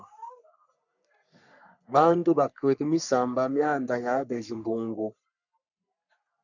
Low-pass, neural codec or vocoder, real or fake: 7.2 kHz; codec, 44.1 kHz, 3.4 kbps, Pupu-Codec; fake